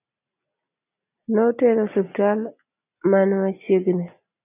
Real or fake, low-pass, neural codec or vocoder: real; 3.6 kHz; none